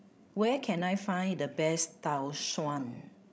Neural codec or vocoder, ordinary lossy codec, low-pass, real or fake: codec, 16 kHz, 16 kbps, FreqCodec, larger model; none; none; fake